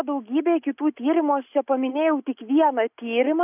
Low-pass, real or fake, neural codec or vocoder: 3.6 kHz; real; none